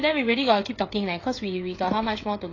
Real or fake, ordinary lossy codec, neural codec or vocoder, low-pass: fake; AAC, 32 kbps; codec, 16 kHz, 16 kbps, FreqCodec, smaller model; 7.2 kHz